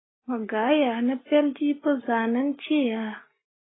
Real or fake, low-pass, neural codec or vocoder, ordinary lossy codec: real; 7.2 kHz; none; AAC, 16 kbps